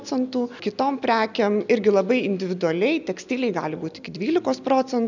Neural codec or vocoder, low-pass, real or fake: none; 7.2 kHz; real